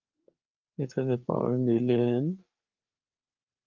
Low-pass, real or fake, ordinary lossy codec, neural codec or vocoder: 7.2 kHz; fake; Opus, 24 kbps; codec, 16 kHz, 4 kbps, FreqCodec, larger model